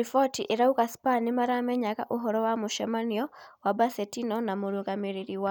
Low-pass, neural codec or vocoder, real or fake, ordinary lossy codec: none; none; real; none